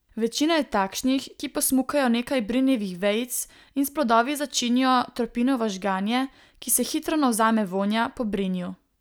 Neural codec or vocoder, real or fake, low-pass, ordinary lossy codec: none; real; none; none